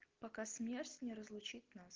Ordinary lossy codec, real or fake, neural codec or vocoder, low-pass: Opus, 16 kbps; real; none; 7.2 kHz